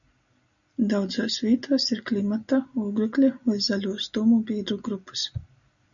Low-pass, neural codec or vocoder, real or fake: 7.2 kHz; none; real